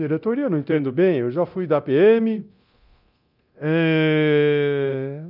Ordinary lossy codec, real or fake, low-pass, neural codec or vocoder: none; fake; 5.4 kHz; codec, 24 kHz, 0.9 kbps, DualCodec